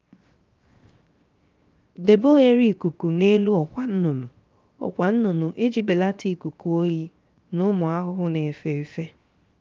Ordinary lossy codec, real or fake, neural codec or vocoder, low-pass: Opus, 32 kbps; fake; codec, 16 kHz, 0.7 kbps, FocalCodec; 7.2 kHz